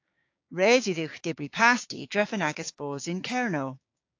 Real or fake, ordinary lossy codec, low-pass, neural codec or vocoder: fake; AAC, 48 kbps; 7.2 kHz; codec, 16 kHz, 6 kbps, DAC